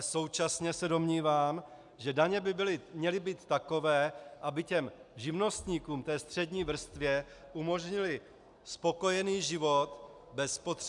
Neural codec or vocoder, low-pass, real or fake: none; 10.8 kHz; real